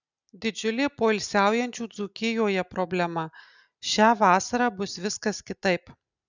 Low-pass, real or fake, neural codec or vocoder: 7.2 kHz; real; none